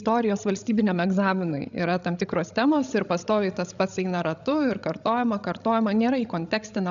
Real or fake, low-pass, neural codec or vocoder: fake; 7.2 kHz; codec, 16 kHz, 16 kbps, FreqCodec, larger model